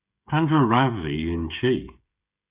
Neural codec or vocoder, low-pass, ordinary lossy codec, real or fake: codec, 16 kHz, 16 kbps, FreqCodec, smaller model; 3.6 kHz; Opus, 64 kbps; fake